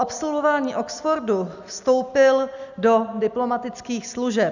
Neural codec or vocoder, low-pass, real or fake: none; 7.2 kHz; real